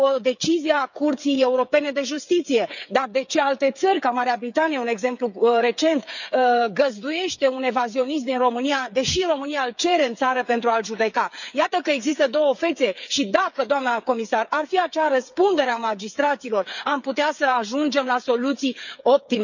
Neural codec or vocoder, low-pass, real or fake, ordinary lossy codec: codec, 16 kHz, 8 kbps, FreqCodec, smaller model; 7.2 kHz; fake; none